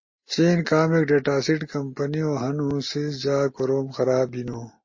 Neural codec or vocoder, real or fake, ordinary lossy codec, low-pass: none; real; MP3, 32 kbps; 7.2 kHz